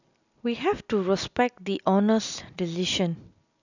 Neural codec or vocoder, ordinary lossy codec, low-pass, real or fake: none; none; 7.2 kHz; real